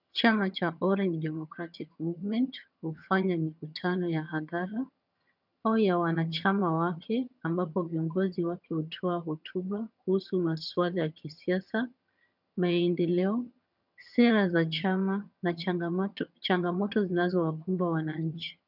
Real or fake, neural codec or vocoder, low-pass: fake; vocoder, 22.05 kHz, 80 mel bands, HiFi-GAN; 5.4 kHz